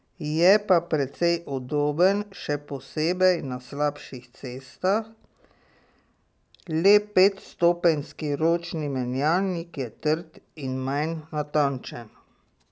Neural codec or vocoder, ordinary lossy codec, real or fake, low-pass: none; none; real; none